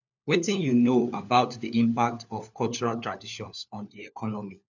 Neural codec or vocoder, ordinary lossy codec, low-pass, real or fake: codec, 16 kHz, 4 kbps, FunCodec, trained on LibriTTS, 50 frames a second; none; 7.2 kHz; fake